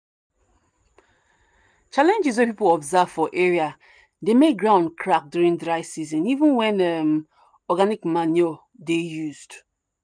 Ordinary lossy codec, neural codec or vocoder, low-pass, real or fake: none; none; 9.9 kHz; real